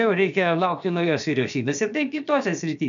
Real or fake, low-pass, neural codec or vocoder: fake; 7.2 kHz; codec, 16 kHz, about 1 kbps, DyCAST, with the encoder's durations